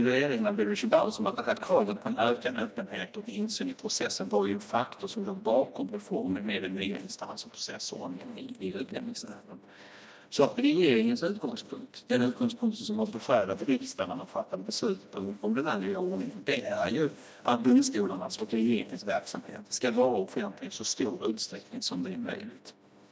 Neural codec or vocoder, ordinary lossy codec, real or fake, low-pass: codec, 16 kHz, 1 kbps, FreqCodec, smaller model; none; fake; none